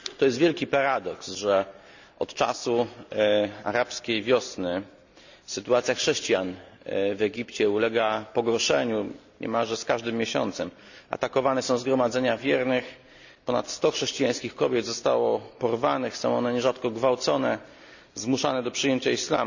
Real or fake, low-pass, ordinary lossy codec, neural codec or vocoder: real; 7.2 kHz; none; none